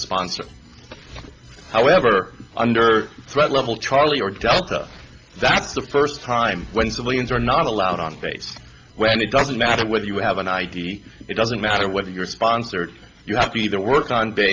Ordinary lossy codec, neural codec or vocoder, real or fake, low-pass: Opus, 24 kbps; none; real; 7.2 kHz